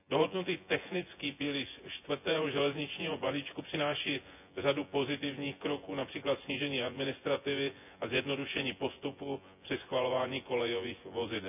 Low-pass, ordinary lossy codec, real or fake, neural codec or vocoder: 3.6 kHz; none; fake; vocoder, 24 kHz, 100 mel bands, Vocos